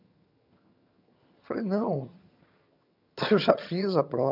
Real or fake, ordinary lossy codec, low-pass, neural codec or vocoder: fake; none; 5.4 kHz; vocoder, 22.05 kHz, 80 mel bands, HiFi-GAN